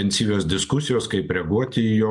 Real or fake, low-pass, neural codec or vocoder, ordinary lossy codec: real; 10.8 kHz; none; MP3, 64 kbps